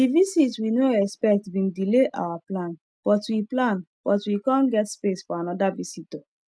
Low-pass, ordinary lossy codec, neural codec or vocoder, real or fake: none; none; none; real